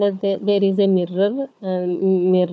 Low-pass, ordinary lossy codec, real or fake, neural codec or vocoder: none; none; fake; codec, 16 kHz, 4 kbps, FunCodec, trained on Chinese and English, 50 frames a second